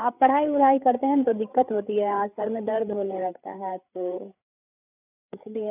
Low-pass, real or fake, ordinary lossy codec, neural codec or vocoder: 3.6 kHz; fake; none; codec, 16 kHz, 8 kbps, FreqCodec, larger model